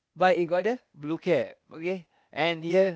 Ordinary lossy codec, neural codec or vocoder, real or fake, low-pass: none; codec, 16 kHz, 0.8 kbps, ZipCodec; fake; none